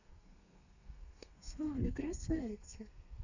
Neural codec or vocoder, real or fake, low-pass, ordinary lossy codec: codec, 32 kHz, 1.9 kbps, SNAC; fake; 7.2 kHz; none